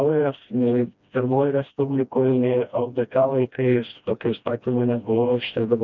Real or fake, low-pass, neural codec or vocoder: fake; 7.2 kHz; codec, 16 kHz, 1 kbps, FreqCodec, smaller model